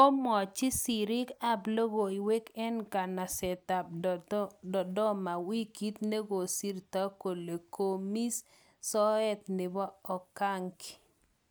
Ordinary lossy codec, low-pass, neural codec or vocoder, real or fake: none; none; none; real